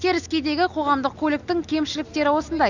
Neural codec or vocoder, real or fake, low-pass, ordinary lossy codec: none; real; 7.2 kHz; none